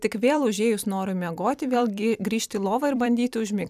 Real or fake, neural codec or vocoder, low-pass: fake; vocoder, 44.1 kHz, 128 mel bands every 256 samples, BigVGAN v2; 14.4 kHz